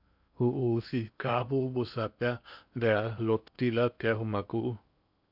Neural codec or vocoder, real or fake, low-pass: codec, 16 kHz in and 24 kHz out, 0.8 kbps, FocalCodec, streaming, 65536 codes; fake; 5.4 kHz